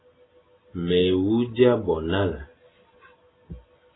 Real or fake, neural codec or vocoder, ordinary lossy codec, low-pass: real; none; AAC, 16 kbps; 7.2 kHz